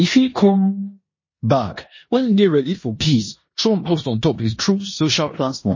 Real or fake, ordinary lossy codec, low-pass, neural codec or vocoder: fake; MP3, 32 kbps; 7.2 kHz; codec, 16 kHz in and 24 kHz out, 0.9 kbps, LongCat-Audio-Codec, four codebook decoder